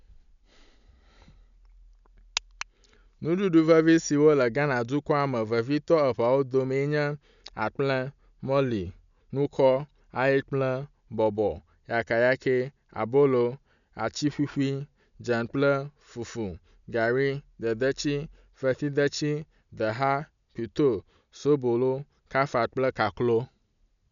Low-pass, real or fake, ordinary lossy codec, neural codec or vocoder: 7.2 kHz; real; none; none